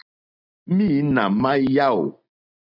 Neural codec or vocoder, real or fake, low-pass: none; real; 5.4 kHz